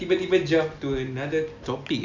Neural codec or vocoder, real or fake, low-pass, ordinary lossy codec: none; real; 7.2 kHz; none